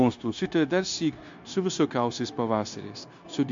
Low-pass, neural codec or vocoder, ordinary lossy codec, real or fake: 7.2 kHz; codec, 16 kHz, 0.9 kbps, LongCat-Audio-Codec; MP3, 48 kbps; fake